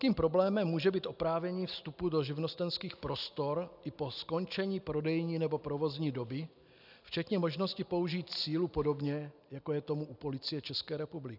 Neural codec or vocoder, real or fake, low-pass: none; real; 5.4 kHz